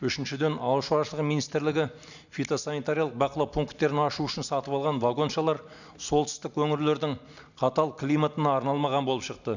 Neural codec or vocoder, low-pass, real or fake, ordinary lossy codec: none; 7.2 kHz; real; none